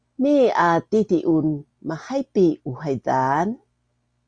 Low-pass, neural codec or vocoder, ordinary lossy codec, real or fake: 9.9 kHz; none; Opus, 64 kbps; real